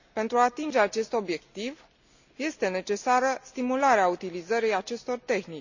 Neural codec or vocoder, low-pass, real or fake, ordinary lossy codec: none; 7.2 kHz; real; none